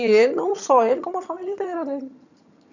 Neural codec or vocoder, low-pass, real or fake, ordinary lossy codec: vocoder, 22.05 kHz, 80 mel bands, HiFi-GAN; 7.2 kHz; fake; none